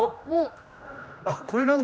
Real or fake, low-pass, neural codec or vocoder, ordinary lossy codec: fake; none; codec, 16 kHz, 1 kbps, X-Codec, HuBERT features, trained on general audio; none